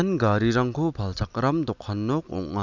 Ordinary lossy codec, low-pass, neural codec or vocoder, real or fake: none; 7.2 kHz; none; real